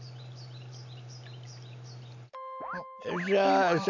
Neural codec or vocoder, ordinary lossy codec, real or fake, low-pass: none; none; real; 7.2 kHz